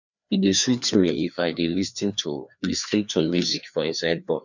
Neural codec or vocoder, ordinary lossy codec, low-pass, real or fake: codec, 16 kHz, 2 kbps, FreqCodec, larger model; none; 7.2 kHz; fake